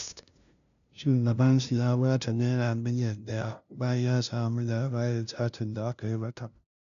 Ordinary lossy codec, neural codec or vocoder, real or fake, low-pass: MP3, 96 kbps; codec, 16 kHz, 0.5 kbps, FunCodec, trained on LibriTTS, 25 frames a second; fake; 7.2 kHz